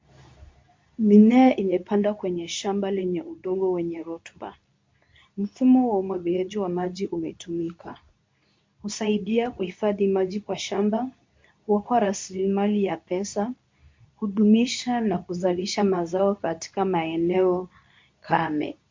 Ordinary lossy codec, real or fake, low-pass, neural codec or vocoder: MP3, 48 kbps; fake; 7.2 kHz; codec, 24 kHz, 0.9 kbps, WavTokenizer, medium speech release version 2